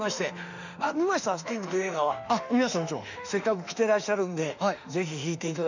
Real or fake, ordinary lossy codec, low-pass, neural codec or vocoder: fake; none; 7.2 kHz; autoencoder, 48 kHz, 32 numbers a frame, DAC-VAE, trained on Japanese speech